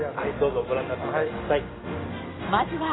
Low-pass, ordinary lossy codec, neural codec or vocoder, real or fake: 7.2 kHz; AAC, 16 kbps; none; real